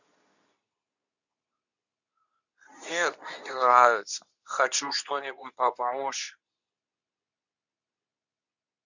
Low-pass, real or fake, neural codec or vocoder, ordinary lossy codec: 7.2 kHz; fake; codec, 24 kHz, 0.9 kbps, WavTokenizer, medium speech release version 2; none